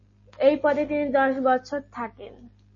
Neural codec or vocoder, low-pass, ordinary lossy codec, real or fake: none; 7.2 kHz; MP3, 32 kbps; real